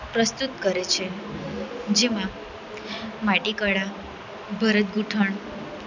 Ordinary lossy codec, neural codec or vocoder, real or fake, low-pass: none; none; real; 7.2 kHz